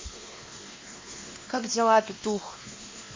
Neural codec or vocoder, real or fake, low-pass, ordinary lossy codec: codec, 16 kHz, 2 kbps, X-Codec, WavLM features, trained on Multilingual LibriSpeech; fake; 7.2 kHz; MP3, 32 kbps